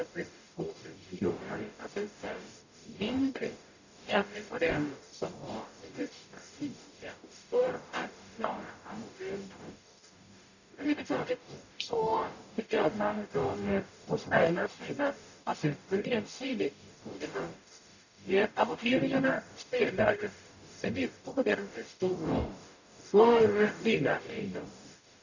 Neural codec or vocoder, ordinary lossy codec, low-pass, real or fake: codec, 44.1 kHz, 0.9 kbps, DAC; none; 7.2 kHz; fake